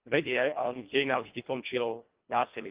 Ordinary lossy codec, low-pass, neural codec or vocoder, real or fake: Opus, 24 kbps; 3.6 kHz; codec, 24 kHz, 1.5 kbps, HILCodec; fake